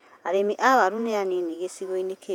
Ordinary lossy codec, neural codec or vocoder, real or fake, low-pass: none; vocoder, 48 kHz, 128 mel bands, Vocos; fake; 19.8 kHz